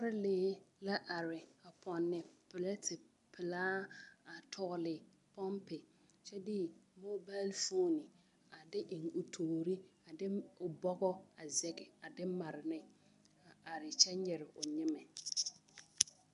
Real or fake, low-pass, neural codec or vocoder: real; 10.8 kHz; none